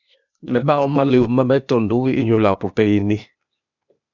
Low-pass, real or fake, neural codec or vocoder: 7.2 kHz; fake; codec, 16 kHz, 0.8 kbps, ZipCodec